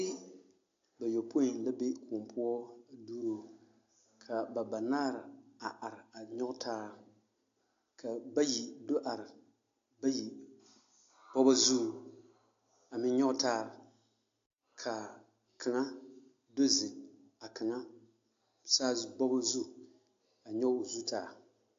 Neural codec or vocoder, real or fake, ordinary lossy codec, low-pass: none; real; AAC, 48 kbps; 7.2 kHz